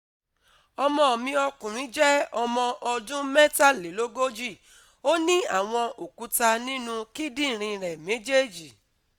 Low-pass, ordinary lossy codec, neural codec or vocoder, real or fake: 19.8 kHz; none; none; real